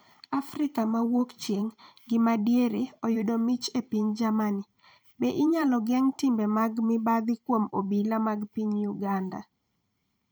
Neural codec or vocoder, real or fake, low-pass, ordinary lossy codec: vocoder, 44.1 kHz, 128 mel bands every 512 samples, BigVGAN v2; fake; none; none